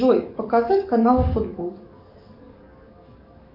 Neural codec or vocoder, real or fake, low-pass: codec, 44.1 kHz, 7.8 kbps, DAC; fake; 5.4 kHz